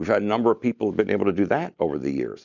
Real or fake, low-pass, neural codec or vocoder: real; 7.2 kHz; none